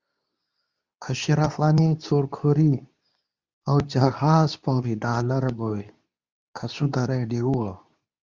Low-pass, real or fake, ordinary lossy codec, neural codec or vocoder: 7.2 kHz; fake; Opus, 64 kbps; codec, 24 kHz, 0.9 kbps, WavTokenizer, medium speech release version 2